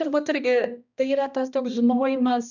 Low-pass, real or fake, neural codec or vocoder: 7.2 kHz; fake; codec, 16 kHz, 1 kbps, X-Codec, HuBERT features, trained on balanced general audio